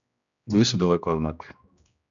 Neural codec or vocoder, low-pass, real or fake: codec, 16 kHz, 1 kbps, X-Codec, HuBERT features, trained on general audio; 7.2 kHz; fake